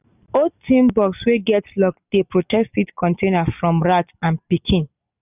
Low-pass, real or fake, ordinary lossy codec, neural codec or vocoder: 3.6 kHz; real; none; none